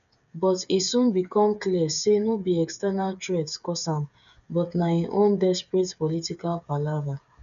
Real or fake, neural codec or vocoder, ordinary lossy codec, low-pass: fake; codec, 16 kHz, 8 kbps, FreqCodec, smaller model; none; 7.2 kHz